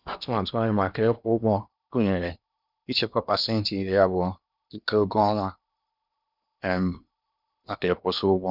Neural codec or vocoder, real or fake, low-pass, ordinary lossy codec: codec, 16 kHz in and 24 kHz out, 0.8 kbps, FocalCodec, streaming, 65536 codes; fake; 5.4 kHz; none